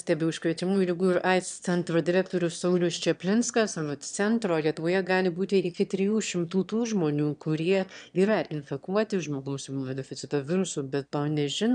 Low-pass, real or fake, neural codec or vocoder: 9.9 kHz; fake; autoencoder, 22.05 kHz, a latent of 192 numbers a frame, VITS, trained on one speaker